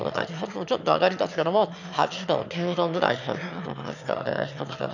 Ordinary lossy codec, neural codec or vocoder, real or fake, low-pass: none; autoencoder, 22.05 kHz, a latent of 192 numbers a frame, VITS, trained on one speaker; fake; 7.2 kHz